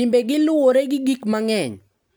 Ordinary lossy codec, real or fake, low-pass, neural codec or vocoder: none; real; none; none